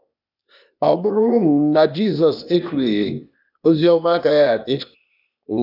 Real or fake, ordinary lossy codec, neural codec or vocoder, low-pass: fake; none; codec, 16 kHz, 0.8 kbps, ZipCodec; 5.4 kHz